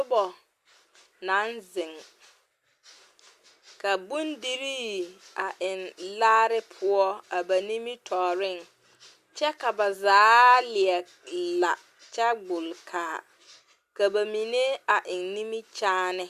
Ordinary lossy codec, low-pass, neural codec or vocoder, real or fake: Opus, 64 kbps; 14.4 kHz; none; real